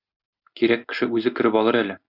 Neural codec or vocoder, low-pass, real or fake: none; 5.4 kHz; real